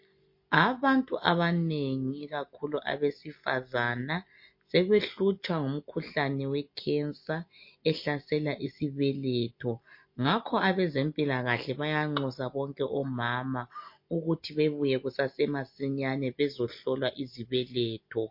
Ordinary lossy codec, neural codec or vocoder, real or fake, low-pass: MP3, 32 kbps; none; real; 5.4 kHz